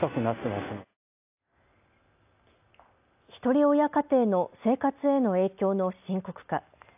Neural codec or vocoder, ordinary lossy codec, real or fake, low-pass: none; AAC, 32 kbps; real; 3.6 kHz